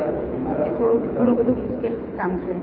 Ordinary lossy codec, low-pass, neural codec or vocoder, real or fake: none; 5.4 kHz; codec, 24 kHz, 6 kbps, HILCodec; fake